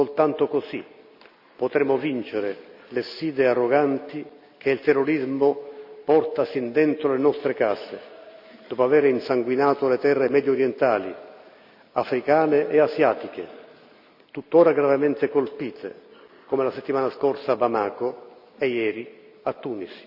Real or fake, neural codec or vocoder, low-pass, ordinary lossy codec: real; none; 5.4 kHz; none